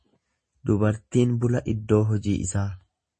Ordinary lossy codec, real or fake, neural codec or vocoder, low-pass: MP3, 32 kbps; real; none; 10.8 kHz